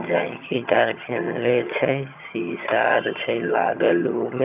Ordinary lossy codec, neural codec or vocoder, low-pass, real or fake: none; vocoder, 22.05 kHz, 80 mel bands, HiFi-GAN; 3.6 kHz; fake